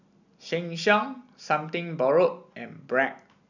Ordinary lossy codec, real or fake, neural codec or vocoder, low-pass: none; real; none; 7.2 kHz